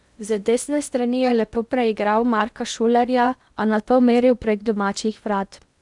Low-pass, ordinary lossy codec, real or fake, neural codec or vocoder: 10.8 kHz; none; fake; codec, 16 kHz in and 24 kHz out, 0.8 kbps, FocalCodec, streaming, 65536 codes